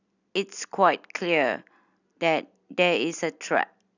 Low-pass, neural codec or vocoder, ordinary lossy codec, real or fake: 7.2 kHz; none; none; real